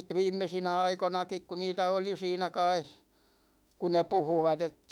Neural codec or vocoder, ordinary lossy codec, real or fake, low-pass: autoencoder, 48 kHz, 32 numbers a frame, DAC-VAE, trained on Japanese speech; none; fake; 19.8 kHz